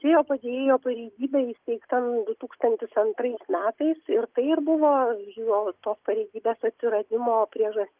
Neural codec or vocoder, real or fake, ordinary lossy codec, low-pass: none; real; Opus, 32 kbps; 3.6 kHz